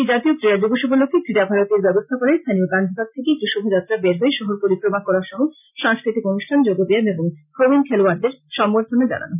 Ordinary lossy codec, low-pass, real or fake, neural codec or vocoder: none; 3.6 kHz; real; none